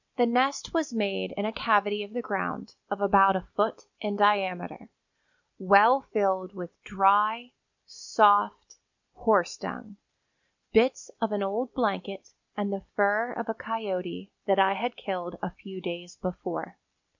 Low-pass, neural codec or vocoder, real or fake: 7.2 kHz; none; real